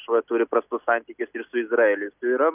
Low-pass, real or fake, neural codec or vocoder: 3.6 kHz; real; none